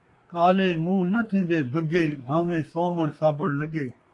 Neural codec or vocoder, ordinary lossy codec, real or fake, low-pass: codec, 24 kHz, 1 kbps, SNAC; AAC, 48 kbps; fake; 10.8 kHz